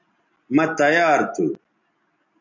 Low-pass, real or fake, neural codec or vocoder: 7.2 kHz; real; none